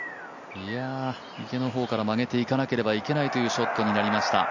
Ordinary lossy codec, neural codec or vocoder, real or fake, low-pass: none; none; real; 7.2 kHz